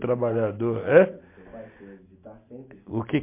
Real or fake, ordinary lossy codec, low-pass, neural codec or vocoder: real; MP3, 24 kbps; 3.6 kHz; none